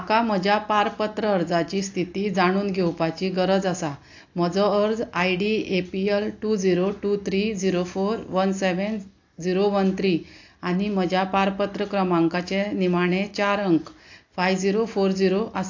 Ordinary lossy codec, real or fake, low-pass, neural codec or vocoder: AAC, 48 kbps; real; 7.2 kHz; none